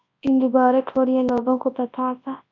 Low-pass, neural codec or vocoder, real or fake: 7.2 kHz; codec, 24 kHz, 0.9 kbps, WavTokenizer, large speech release; fake